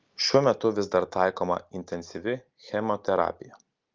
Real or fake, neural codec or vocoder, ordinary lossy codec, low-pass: real; none; Opus, 32 kbps; 7.2 kHz